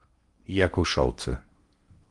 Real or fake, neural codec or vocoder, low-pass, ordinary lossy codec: fake; codec, 16 kHz in and 24 kHz out, 0.8 kbps, FocalCodec, streaming, 65536 codes; 10.8 kHz; Opus, 24 kbps